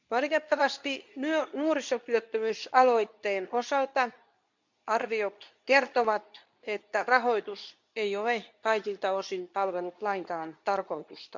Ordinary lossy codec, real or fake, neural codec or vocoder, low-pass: none; fake; codec, 24 kHz, 0.9 kbps, WavTokenizer, medium speech release version 2; 7.2 kHz